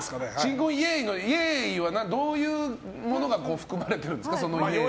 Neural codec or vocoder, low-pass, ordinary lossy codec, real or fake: none; none; none; real